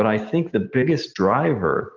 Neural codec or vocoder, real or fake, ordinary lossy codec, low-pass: none; real; Opus, 24 kbps; 7.2 kHz